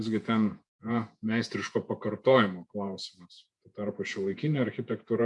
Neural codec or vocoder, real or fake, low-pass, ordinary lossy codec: vocoder, 48 kHz, 128 mel bands, Vocos; fake; 10.8 kHz; MP3, 64 kbps